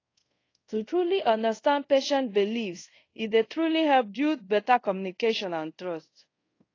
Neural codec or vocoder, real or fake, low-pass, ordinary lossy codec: codec, 24 kHz, 0.5 kbps, DualCodec; fake; 7.2 kHz; AAC, 32 kbps